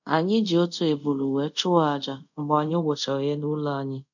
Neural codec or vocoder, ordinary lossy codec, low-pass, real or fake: codec, 24 kHz, 0.5 kbps, DualCodec; none; 7.2 kHz; fake